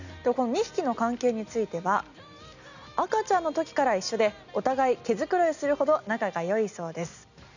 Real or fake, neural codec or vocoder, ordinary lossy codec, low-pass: real; none; none; 7.2 kHz